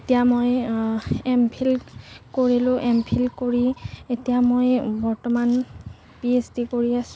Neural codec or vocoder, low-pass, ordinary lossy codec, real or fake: none; none; none; real